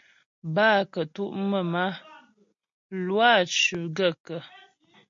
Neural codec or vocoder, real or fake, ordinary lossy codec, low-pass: none; real; MP3, 48 kbps; 7.2 kHz